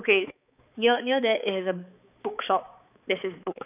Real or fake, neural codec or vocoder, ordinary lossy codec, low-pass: fake; codec, 16 kHz, 4 kbps, X-Codec, HuBERT features, trained on balanced general audio; none; 3.6 kHz